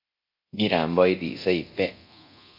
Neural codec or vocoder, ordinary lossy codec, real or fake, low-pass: codec, 24 kHz, 0.9 kbps, DualCodec; MP3, 48 kbps; fake; 5.4 kHz